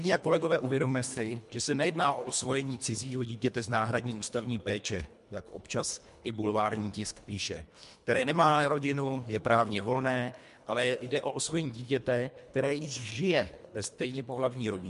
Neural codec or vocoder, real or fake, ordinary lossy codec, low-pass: codec, 24 kHz, 1.5 kbps, HILCodec; fake; MP3, 64 kbps; 10.8 kHz